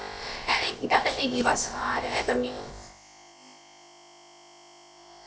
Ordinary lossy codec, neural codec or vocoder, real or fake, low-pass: none; codec, 16 kHz, about 1 kbps, DyCAST, with the encoder's durations; fake; none